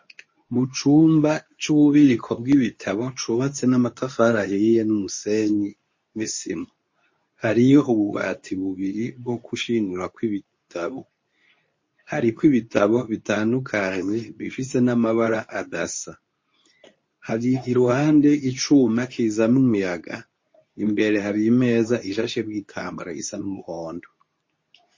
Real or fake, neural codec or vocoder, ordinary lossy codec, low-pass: fake; codec, 24 kHz, 0.9 kbps, WavTokenizer, medium speech release version 2; MP3, 32 kbps; 7.2 kHz